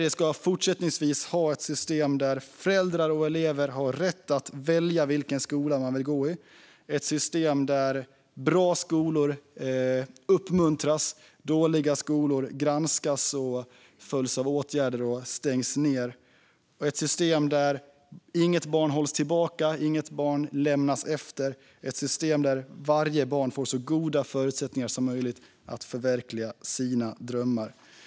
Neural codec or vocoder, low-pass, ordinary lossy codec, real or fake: none; none; none; real